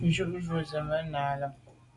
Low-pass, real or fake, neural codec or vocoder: 10.8 kHz; real; none